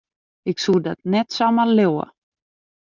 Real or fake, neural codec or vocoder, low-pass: real; none; 7.2 kHz